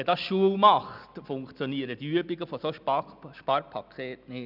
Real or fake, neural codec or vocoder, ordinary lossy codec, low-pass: real; none; none; 5.4 kHz